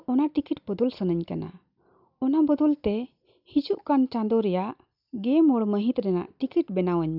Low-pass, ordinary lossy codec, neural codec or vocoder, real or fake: 5.4 kHz; none; none; real